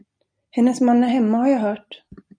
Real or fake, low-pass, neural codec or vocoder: real; 9.9 kHz; none